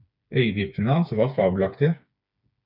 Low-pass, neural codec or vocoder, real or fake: 5.4 kHz; codec, 16 kHz, 4 kbps, FreqCodec, smaller model; fake